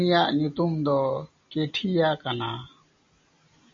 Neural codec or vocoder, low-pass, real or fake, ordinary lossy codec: none; 7.2 kHz; real; MP3, 32 kbps